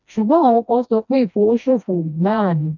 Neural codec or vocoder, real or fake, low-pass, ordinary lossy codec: codec, 16 kHz, 1 kbps, FreqCodec, smaller model; fake; 7.2 kHz; none